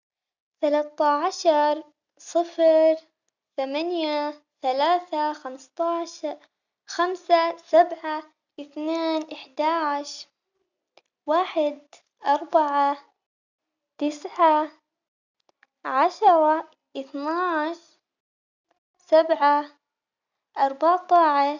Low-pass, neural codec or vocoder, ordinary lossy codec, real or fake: 7.2 kHz; none; none; real